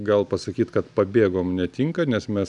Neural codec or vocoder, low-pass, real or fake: none; 10.8 kHz; real